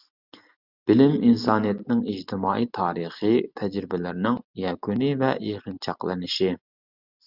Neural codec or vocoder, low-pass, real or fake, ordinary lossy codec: none; 5.4 kHz; real; Opus, 64 kbps